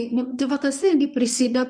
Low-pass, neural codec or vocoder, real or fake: 9.9 kHz; codec, 24 kHz, 0.9 kbps, WavTokenizer, medium speech release version 1; fake